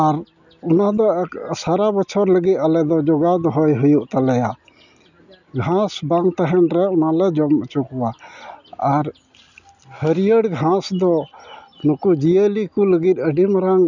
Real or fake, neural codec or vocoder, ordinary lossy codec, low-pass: real; none; none; 7.2 kHz